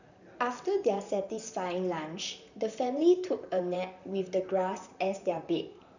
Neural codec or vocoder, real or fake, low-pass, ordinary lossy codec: vocoder, 44.1 kHz, 128 mel bands, Pupu-Vocoder; fake; 7.2 kHz; none